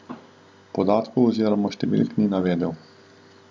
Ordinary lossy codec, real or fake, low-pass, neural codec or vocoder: none; real; none; none